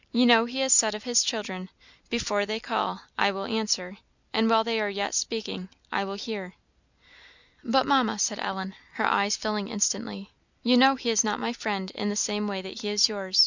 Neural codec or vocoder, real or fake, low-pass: none; real; 7.2 kHz